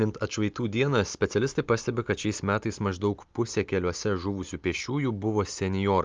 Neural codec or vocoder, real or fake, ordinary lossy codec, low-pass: none; real; Opus, 32 kbps; 7.2 kHz